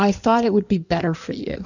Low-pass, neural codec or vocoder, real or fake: 7.2 kHz; codec, 16 kHz in and 24 kHz out, 1.1 kbps, FireRedTTS-2 codec; fake